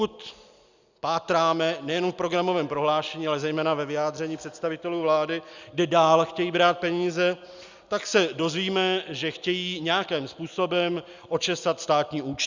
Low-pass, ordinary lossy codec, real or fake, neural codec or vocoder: 7.2 kHz; Opus, 64 kbps; real; none